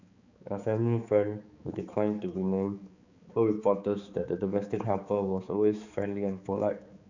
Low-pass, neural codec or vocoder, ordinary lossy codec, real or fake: 7.2 kHz; codec, 16 kHz, 4 kbps, X-Codec, HuBERT features, trained on balanced general audio; Opus, 64 kbps; fake